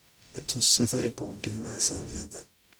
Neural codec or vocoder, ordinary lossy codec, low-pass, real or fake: codec, 44.1 kHz, 0.9 kbps, DAC; none; none; fake